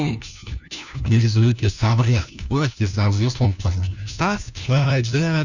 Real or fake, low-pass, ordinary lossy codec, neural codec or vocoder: fake; 7.2 kHz; none; codec, 16 kHz, 1 kbps, FunCodec, trained on LibriTTS, 50 frames a second